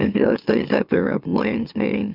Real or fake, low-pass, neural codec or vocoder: fake; 5.4 kHz; autoencoder, 44.1 kHz, a latent of 192 numbers a frame, MeloTTS